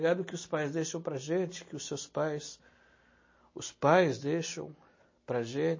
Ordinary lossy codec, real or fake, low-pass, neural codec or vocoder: MP3, 32 kbps; real; 7.2 kHz; none